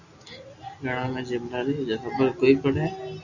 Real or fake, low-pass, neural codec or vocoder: real; 7.2 kHz; none